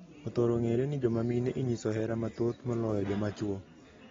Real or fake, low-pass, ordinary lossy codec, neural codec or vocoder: real; 7.2 kHz; AAC, 24 kbps; none